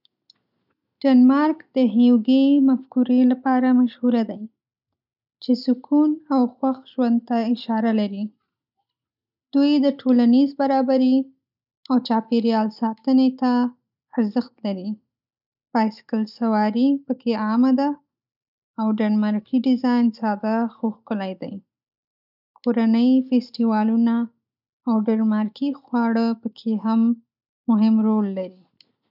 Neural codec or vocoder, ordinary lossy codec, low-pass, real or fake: none; none; 5.4 kHz; real